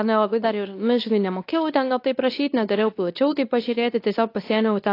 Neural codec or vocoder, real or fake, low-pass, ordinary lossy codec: codec, 24 kHz, 0.9 kbps, WavTokenizer, medium speech release version 2; fake; 5.4 kHz; AAC, 32 kbps